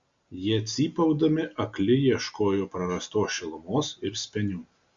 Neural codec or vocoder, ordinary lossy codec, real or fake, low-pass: none; Opus, 64 kbps; real; 7.2 kHz